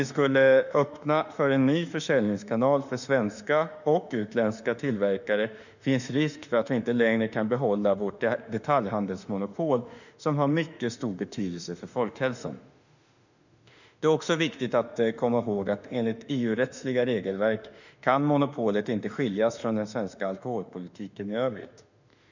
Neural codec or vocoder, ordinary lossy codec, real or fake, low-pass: autoencoder, 48 kHz, 32 numbers a frame, DAC-VAE, trained on Japanese speech; none; fake; 7.2 kHz